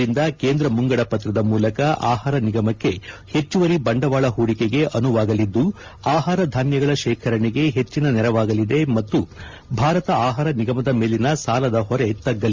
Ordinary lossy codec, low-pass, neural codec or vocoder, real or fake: Opus, 32 kbps; 7.2 kHz; none; real